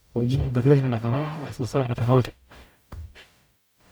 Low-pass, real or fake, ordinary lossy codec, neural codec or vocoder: none; fake; none; codec, 44.1 kHz, 0.9 kbps, DAC